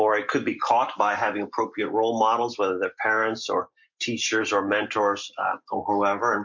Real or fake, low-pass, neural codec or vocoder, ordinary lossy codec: real; 7.2 kHz; none; MP3, 48 kbps